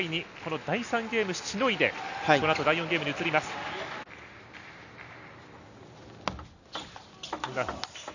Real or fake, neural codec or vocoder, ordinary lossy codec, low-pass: real; none; none; 7.2 kHz